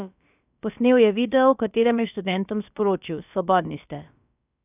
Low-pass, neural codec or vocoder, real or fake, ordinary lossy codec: 3.6 kHz; codec, 16 kHz, about 1 kbps, DyCAST, with the encoder's durations; fake; none